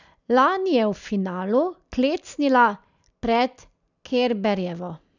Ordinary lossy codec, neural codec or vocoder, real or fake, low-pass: none; none; real; 7.2 kHz